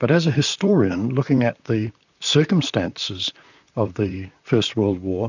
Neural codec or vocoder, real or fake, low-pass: vocoder, 44.1 kHz, 128 mel bands, Pupu-Vocoder; fake; 7.2 kHz